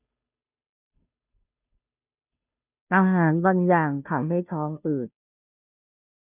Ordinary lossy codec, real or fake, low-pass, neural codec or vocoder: none; fake; 3.6 kHz; codec, 16 kHz, 0.5 kbps, FunCodec, trained on Chinese and English, 25 frames a second